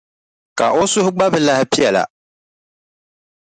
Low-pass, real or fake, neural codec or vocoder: 9.9 kHz; real; none